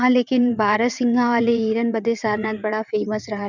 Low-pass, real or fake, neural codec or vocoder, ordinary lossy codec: 7.2 kHz; fake; vocoder, 22.05 kHz, 80 mel bands, WaveNeXt; none